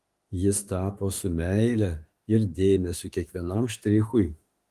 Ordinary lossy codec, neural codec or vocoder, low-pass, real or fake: Opus, 24 kbps; autoencoder, 48 kHz, 32 numbers a frame, DAC-VAE, trained on Japanese speech; 14.4 kHz; fake